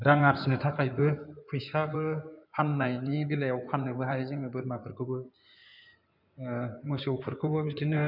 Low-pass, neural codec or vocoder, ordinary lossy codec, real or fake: 5.4 kHz; codec, 16 kHz in and 24 kHz out, 2.2 kbps, FireRedTTS-2 codec; none; fake